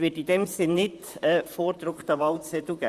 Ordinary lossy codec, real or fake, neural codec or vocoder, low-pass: Opus, 64 kbps; fake; vocoder, 44.1 kHz, 128 mel bands, Pupu-Vocoder; 14.4 kHz